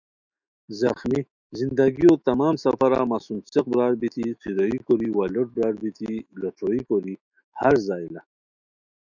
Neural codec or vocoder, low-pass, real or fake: autoencoder, 48 kHz, 128 numbers a frame, DAC-VAE, trained on Japanese speech; 7.2 kHz; fake